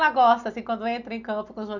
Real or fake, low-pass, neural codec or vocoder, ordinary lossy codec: real; 7.2 kHz; none; none